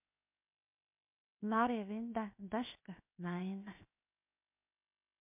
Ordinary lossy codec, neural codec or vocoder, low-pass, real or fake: MP3, 32 kbps; codec, 16 kHz, 0.7 kbps, FocalCodec; 3.6 kHz; fake